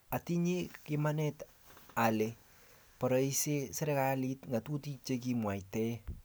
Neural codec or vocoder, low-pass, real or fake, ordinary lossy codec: none; none; real; none